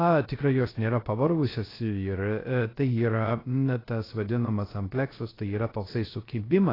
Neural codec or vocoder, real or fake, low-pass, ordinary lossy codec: codec, 16 kHz, 0.3 kbps, FocalCodec; fake; 5.4 kHz; AAC, 24 kbps